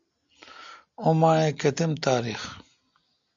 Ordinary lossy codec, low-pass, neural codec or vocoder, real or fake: AAC, 64 kbps; 7.2 kHz; none; real